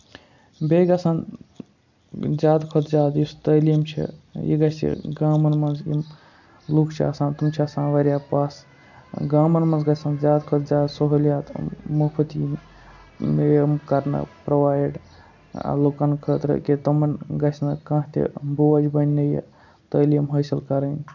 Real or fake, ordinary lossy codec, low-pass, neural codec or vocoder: real; none; 7.2 kHz; none